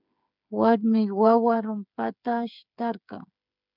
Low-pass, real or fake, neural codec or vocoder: 5.4 kHz; fake; codec, 16 kHz, 8 kbps, FreqCodec, smaller model